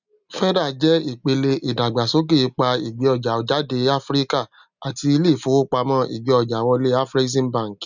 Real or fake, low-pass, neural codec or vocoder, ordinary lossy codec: real; 7.2 kHz; none; none